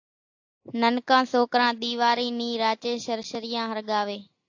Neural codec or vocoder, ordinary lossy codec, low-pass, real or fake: none; AAC, 48 kbps; 7.2 kHz; real